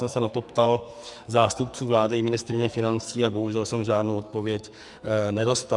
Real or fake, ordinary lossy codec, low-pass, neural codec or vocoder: fake; Opus, 64 kbps; 10.8 kHz; codec, 44.1 kHz, 2.6 kbps, SNAC